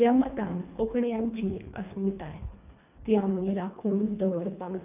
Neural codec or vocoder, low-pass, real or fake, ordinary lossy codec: codec, 24 kHz, 1.5 kbps, HILCodec; 3.6 kHz; fake; none